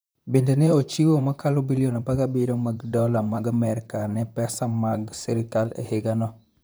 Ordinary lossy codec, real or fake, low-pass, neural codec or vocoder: none; fake; none; vocoder, 44.1 kHz, 128 mel bands, Pupu-Vocoder